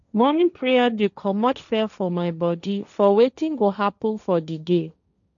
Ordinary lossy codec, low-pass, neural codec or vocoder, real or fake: none; 7.2 kHz; codec, 16 kHz, 1.1 kbps, Voila-Tokenizer; fake